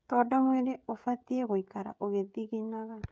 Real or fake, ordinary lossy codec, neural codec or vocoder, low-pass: fake; none; codec, 16 kHz, 16 kbps, FreqCodec, smaller model; none